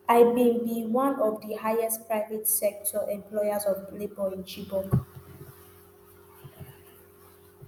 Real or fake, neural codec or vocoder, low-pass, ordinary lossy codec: real; none; none; none